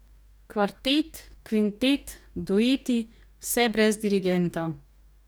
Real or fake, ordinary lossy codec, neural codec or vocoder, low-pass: fake; none; codec, 44.1 kHz, 2.6 kbps, DAC; none